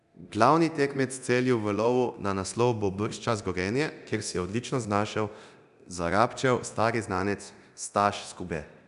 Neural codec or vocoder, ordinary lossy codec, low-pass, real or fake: codec, 24 kHz, 0.9 kbps, DualCodec; none; 10.8 kHz; fake